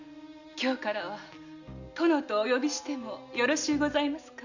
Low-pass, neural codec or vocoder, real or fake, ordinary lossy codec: 7.2 kHz; none; real; none